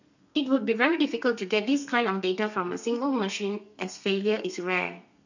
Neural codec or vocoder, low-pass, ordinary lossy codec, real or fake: codec, 32 kHz, 1.9 kbps, SNAC; 7.2 kHz; none; fake